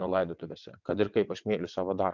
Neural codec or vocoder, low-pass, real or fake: vocoder, 22.05 kHz, 80 mel bands, WaveNeXt; 7.2 kHz; fake